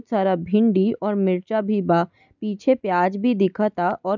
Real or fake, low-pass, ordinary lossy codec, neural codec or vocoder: real; 7.2 kHz; none; none